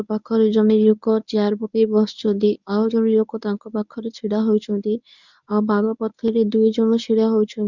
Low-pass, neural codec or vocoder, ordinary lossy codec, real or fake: 7.2 kHz; codec, 24 kHz, 0.9 kbps, WavTokenizer, medium speech release version 1; none; fake